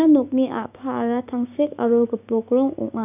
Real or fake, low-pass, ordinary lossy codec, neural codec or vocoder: fake; 3.6 kHz; none; codec, 16 kHz, 6 kbps, DAC